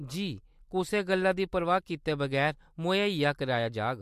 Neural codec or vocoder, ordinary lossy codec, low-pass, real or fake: none; MP3, 64 kbps; 14.4 kHz; real